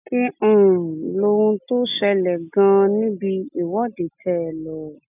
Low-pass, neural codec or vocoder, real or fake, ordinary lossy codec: 3.6 kHz; none; real; none